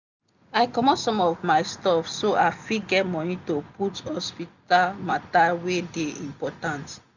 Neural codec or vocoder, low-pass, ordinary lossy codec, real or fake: none; 7.2 kHz; none; real